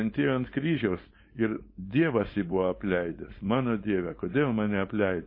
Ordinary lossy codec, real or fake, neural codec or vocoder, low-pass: MP3, 24 kbps; fake; codec, 16 kHz, 4.8 kbps, FACodec; 5.4 kHz